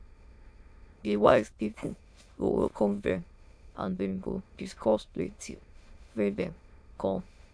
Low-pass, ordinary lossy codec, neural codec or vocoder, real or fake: none; none; autoencoder, 22.05 kHz, a latent of 192 numbers a frame, VITS, trained on many speakers; fake